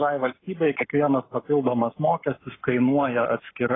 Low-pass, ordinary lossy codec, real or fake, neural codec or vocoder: 7.2 kHz; AAC, 16 kbps; fake; codec, 44.1 kHz, 7.8 kbps, Pupu-Codec